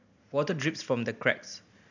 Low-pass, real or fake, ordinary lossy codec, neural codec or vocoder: 7.2 kHz; real; none; none